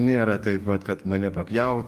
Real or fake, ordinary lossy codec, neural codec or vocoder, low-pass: fake; Opus, 32 kbps; codec, 44.1 kHz, 2.6 kbps, DAC; 14.4 kHz